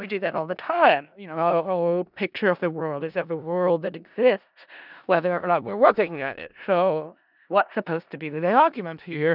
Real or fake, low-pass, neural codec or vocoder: fake; 5.4 kHz; codec, 16 kHz in and 24 kHz out, 0.4 kbps, LongCat-Audio-Codec, four codebook decoder